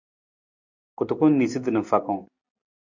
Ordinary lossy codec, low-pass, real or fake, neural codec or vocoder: AAC, 48 kbps; 7.2 kHz; real; none